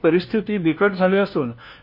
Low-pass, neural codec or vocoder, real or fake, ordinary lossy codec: 5.4 kHz; codec, 16 kHz, 1 kbps, FunCodec, trained on LibriTTS, 50 frames a second; fake; MP3, 32 kbps